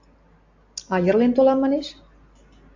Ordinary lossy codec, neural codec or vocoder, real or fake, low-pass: AAC, 48 kbps; none; real; 7.2 kHz